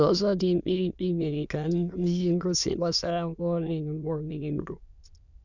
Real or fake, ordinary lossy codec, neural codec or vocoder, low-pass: fake; none; autoencoder, 22.05 kHz, a latent of 192 numbers a frame, VITS, trained on many speakers; 7.2 kHz